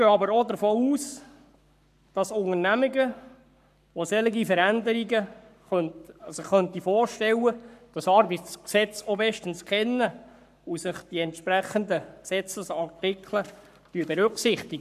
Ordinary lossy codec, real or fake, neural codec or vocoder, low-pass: none; fake; codec, 44.1 kHz, 7.8 kbps, Pupu-Codec; 14.4 kHz